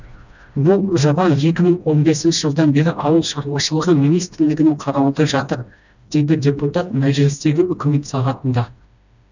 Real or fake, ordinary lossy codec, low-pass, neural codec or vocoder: fake; none; 7.2 kHz; codec, 16 kHz, 1 kbps, FreqCodec, smaller model